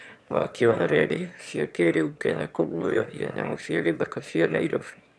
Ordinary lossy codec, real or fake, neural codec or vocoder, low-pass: none; fake; autoencoder, 22.05 kHz, a latent of 192 numbers a frame, VITS, trained on one speaker; none